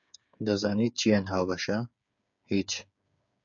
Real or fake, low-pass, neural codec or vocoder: fake; 7.2 kHz; codec, 16 kHz, 8 kbps, FreqCodec, smaller model